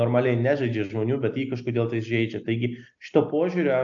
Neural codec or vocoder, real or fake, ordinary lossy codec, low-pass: none; real; AAC, 64 kbps; 7.2 kHz